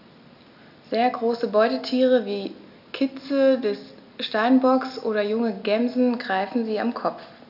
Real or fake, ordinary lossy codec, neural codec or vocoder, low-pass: real; none; none; 5.4 kHz